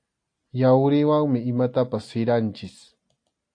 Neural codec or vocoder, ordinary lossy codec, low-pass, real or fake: none; AAC, 48 kbps; 9.9 kHz; real